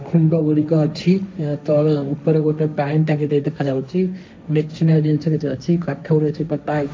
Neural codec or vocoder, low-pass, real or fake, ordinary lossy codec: codec, 16 kHz, 1.1 kbps, Voila-Tokenizer; none; fake; none